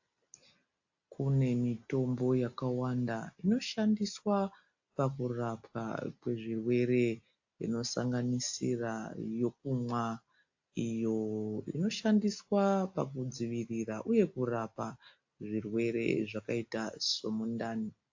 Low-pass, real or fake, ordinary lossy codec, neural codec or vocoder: 7.2 kHz; real; AAC, 48 kbps; none